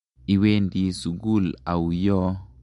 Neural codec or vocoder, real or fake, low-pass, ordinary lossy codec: none; real; 10.8 kHz; MP3, 64 kbps